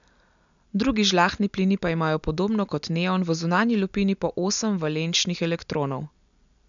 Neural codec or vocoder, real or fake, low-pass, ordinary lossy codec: none; real; 7.2 kHz; none